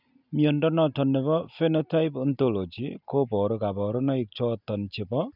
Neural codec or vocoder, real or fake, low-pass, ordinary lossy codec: none; real; 5.4 kHz; none